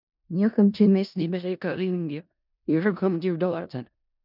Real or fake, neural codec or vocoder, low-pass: fake; codec, 16 kHz in and 24 kHz out, 0.4 kbps, LongCat-Audio-Codec, four codebook decoder; 5.4 kHz